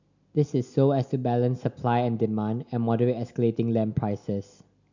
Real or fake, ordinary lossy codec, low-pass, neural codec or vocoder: real; none; 7.2 kHz; none